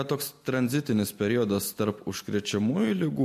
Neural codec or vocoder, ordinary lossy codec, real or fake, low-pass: none; MP3, 64 kbps; real; 14.4 kHz